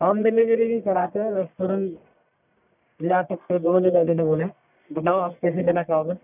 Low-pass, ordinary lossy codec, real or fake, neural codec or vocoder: 3.6 kHz; none; fake; codec, 44.1 kHz, 1.7 kbps, Pupu-Codec